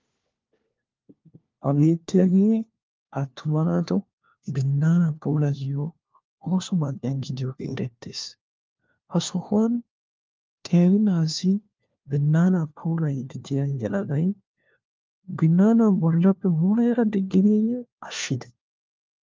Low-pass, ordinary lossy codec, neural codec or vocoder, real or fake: 7.2 kHz; Opus, 24 kbps; codec, 16 kHz, 1 kbps, FunCodec, trained on LibriTTS, 50 frames a second; fake